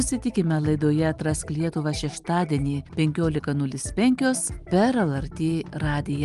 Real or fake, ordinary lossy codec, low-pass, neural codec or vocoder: real; Opus, 32 kbps; 10.8 kHz; none